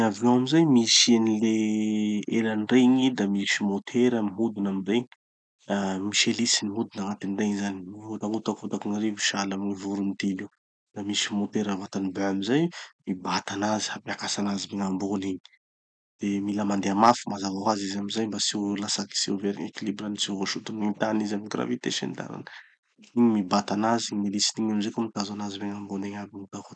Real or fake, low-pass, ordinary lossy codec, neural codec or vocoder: real; 9.9 kHz; none; none